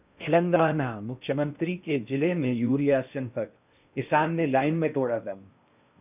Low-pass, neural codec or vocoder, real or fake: 3.6 kHz; codec, 16 kHz in and 24 kHz out, 0.6 kbps, FocalCodec, streaming, 2048 codes; fake